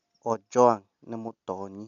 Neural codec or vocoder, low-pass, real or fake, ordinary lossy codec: none; 7.2 kHz; real; none